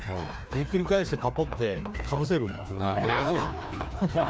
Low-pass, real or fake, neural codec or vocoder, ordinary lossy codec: none; fake; codec, 16 kHz, 2 kbps, FreqCodec, larger model; none